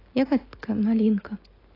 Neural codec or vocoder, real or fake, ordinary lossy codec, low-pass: none; real; AAC, 24 kbps; 5.4 kHz